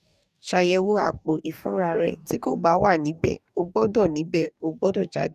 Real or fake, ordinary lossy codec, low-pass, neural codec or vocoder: fake; none; 14.4 kHz; codec, 44.1 kHz, 2.6 kbps, DAC